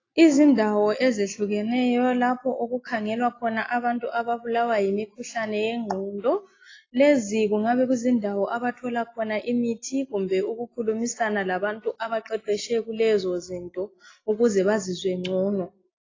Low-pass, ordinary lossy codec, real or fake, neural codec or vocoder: 7.2 kHz; AAC, 32 kbps; real; none